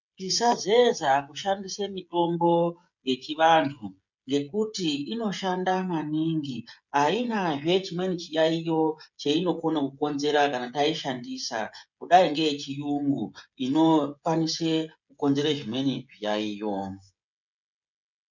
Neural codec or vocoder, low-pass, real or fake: codec, 16 kHz, 16 kbps, FreqCodec, smaller model; 7.2 kHz; fake